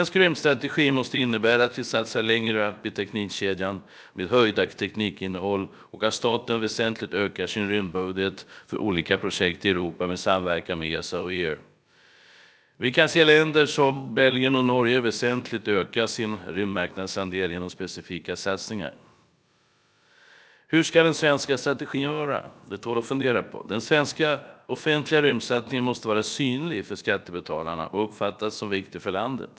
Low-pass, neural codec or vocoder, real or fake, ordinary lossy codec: none; codec, 16 kHz, about 1 kbps, DyCAST, with the encoder's durations; fake; none